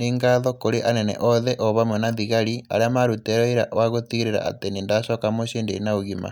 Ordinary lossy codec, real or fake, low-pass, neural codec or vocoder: none; real; 19.8 kHz; none